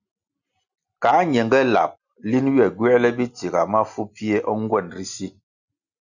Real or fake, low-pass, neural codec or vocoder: real; 7.2 kHz; none